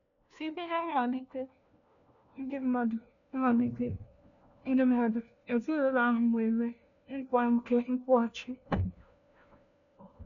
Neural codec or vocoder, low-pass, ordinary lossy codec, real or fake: codec, 16 kHz, 1 kbps, FunCodec, trained on LibriTTS, 50 frames a second; 7.2 kHz; none; fake